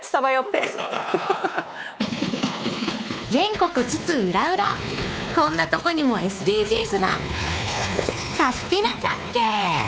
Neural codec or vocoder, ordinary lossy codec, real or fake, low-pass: codec, 16 kHz, 2 kbps, X-Codec, WavLM features, trained on Multilingual LibriSpeech; none; fake; none